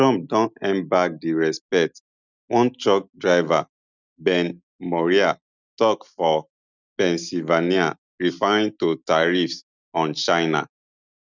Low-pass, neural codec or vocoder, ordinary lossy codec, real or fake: 7.2 kHz; none; none; real